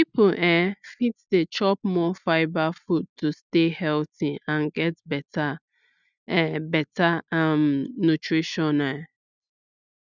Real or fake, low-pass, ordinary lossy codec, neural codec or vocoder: real; 7.2 kHz; none; none